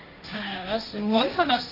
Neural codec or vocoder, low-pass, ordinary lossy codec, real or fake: codec, 16 kHz, 1.1 kbps, Voila-Tokenizer; 5.4 kHz; none; fake